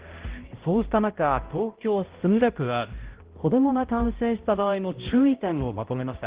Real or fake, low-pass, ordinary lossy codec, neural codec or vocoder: fake; 3.6 kHz; Opus, 16 kbps; codec, 16 kHz, 0.5 kbps, X-Codec, HuBERT features, trained on balanced general audio